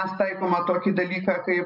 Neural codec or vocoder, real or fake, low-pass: none; real; 5.4 kHz